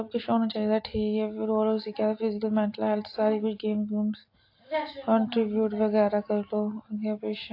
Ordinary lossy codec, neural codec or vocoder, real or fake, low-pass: AAC, 32 kbps; none; real; 5.4 kHz